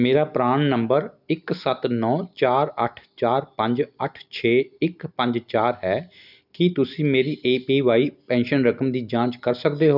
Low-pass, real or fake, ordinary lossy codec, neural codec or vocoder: 5.4 kHz; real; none; none